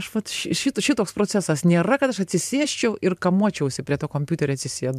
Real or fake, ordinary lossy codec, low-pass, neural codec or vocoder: fake; MP3, 96 kbps; 14.4 kHz; vocoder, 44.1 kHz, 128 mel bands every 512 samples, BigVGAN v2